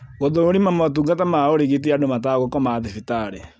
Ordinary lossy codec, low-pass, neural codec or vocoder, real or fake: none; none; none; real